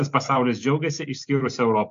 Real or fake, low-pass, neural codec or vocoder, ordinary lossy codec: real; 7.2 kHz; none; AAC, 64 kbps